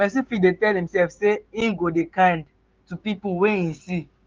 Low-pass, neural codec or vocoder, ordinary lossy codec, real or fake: 7.2 kHz; codec, 16 kHz, 6 kbps, DAC; Opus, 24 kbps; fake